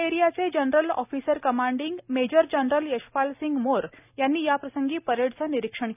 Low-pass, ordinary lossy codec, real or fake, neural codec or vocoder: 3.6 kHz; none; real; none